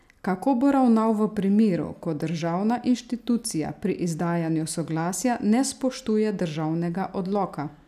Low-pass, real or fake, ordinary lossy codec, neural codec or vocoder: 14.4 kHz; real; none; none